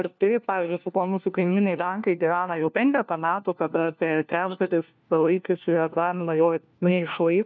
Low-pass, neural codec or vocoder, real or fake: 7.2 kHz; codec, 16 kHz, 1 kbps, FunCodec, trained on LibriTTS, 50 frames a second; fake